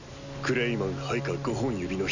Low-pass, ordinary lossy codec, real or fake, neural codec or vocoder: 7.2 kHz; none; real; none